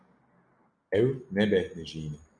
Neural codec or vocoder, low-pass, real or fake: none; 9.9 kHz; real